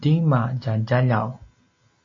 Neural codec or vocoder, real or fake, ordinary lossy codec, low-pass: none; real; AAC, 32 kbps; 7.2 kHz